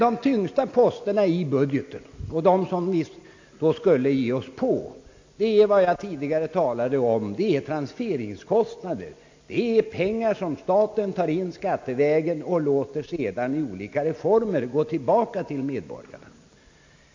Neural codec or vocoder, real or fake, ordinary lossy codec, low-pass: none; real; AAC, 48 kbps; 7.2 kHz